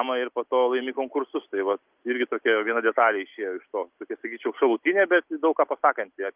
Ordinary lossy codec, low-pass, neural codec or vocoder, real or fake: Opus, 24 kbps; 3.6 kHz; none; real